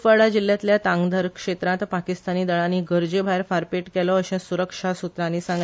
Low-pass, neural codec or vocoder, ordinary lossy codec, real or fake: none; none; none; real